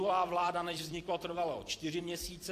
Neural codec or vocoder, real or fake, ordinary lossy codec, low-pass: vocoder, 44.1 kHz, 128 mel bands every 256 samples, BigVGAN v2; fake; AAC, 64 kbps; 14.4 kHz